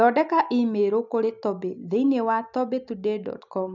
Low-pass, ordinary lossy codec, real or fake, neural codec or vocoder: 7.2 kHz; none; real; none